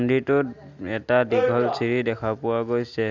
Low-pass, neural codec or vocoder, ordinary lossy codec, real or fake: 7.2 kHz; none; none; real